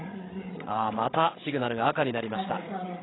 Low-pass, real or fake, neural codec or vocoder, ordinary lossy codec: 7.2 kHz; fake; codec, 16 kHz, 16 kbps, FreqCodec, larger model; AAC, 16 kbps